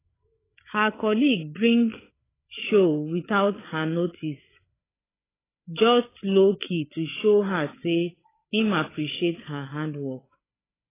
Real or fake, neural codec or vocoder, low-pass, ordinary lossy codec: fake; codec, 16 kHz, 8 kbps, FreqCodec, larger model; 3.6 kHz; AAC, 16 kbps